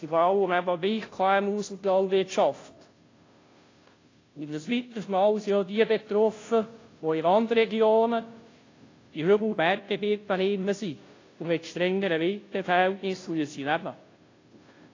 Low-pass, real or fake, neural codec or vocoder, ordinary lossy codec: 7.2 kHz; fake; codec, 16 kHz, 0.5 kbps, FunCodec, trained on Chinese and English, 25 frames a second; AAC, 32 kbps